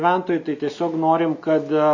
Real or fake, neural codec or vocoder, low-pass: real; none; 7.2 kHz